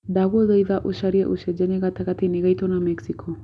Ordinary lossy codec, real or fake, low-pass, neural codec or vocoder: none; real; none; none